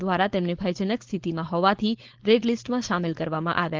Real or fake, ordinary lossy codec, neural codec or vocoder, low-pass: fake; Opus, 16 kbps; codec, 16 kHz, 4.8 kbps, FACodec; 7.2 kHz